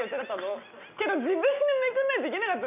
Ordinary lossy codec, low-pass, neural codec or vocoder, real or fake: none; 3.6 kHz; none; real